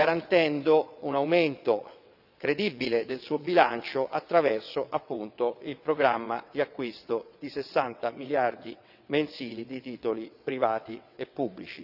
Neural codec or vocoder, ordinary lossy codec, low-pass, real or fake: vocoder, 22.05 kHz, 80 mel bands, WaveNeXt; none; 5.4 kHz; fake